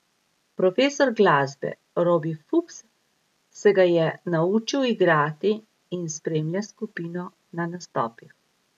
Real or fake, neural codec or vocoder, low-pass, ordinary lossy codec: real; none; 14.4 kHz; none